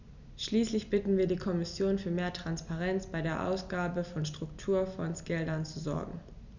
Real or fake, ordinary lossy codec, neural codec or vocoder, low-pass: real; none; none; 7.2 kHz